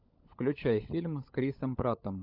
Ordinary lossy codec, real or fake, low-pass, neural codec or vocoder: AAC, 48 kbps; fake; 5.4 kHz; codec, 16 kHz, 16 kbps, FunCodec, trained on LibriTTS, 50 frames a second